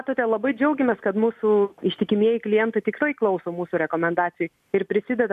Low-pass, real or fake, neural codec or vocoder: 14.4 kHz; real; none